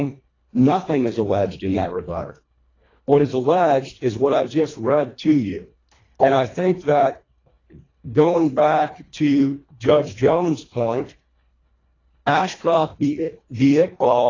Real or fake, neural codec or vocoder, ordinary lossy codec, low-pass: fake; codec, 24 kHz, 1.5 kbps, HILCodec; AAC, 32 kbps; 7.2 kHz